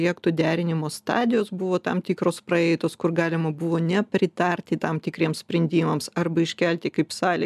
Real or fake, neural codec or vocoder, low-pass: real; none; 14.4 kHz